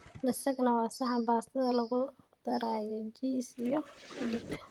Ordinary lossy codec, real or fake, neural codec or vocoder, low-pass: Opus, 32 kbps; fake; vocoder, 44.1 kHz, 128 mel bands, Pupu-Vocoder; 19.8 kHz